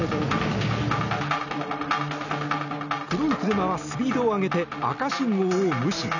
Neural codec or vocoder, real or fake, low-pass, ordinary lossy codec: none; real; 7.2 kHz; none